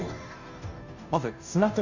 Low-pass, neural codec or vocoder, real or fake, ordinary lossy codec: 7.2 kHz; codec, 16 kHz, 0.5 kbps, FunCodec, trained on Chinese and English, 25 frames a second; fake; none